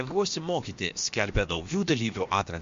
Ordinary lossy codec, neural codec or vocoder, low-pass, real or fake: MP3, 48 kbps; codec, 16 kHz, about 1 kbps, DyCAST, with the encoder's durations; 7.2 kHz; fake